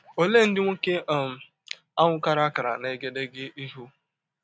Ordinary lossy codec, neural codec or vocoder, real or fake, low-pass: none; none; real; none